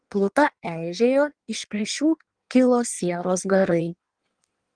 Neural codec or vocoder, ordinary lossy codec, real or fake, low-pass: codec, 16 kHz in and 24 kHz out, 1.1 kbps, FireRedTTS-2 codec; Opus, 24 kbps; fake; 9.9 kHz